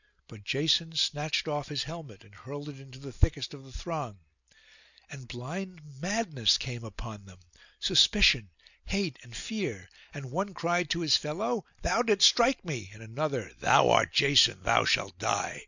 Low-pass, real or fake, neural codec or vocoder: 7.2 kHz; real; none